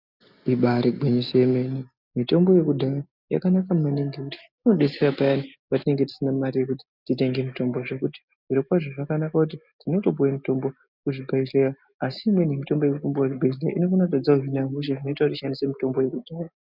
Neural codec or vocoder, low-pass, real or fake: none; 5.4 kHz; real